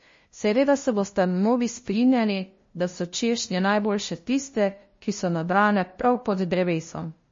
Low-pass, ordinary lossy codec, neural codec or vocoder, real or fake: 7.2 kHz; MP3, 32 kbps; codec, 16 kHz, 0.5 kbps, FunCodec, trained on LibriTTS, 25 frames a second; fake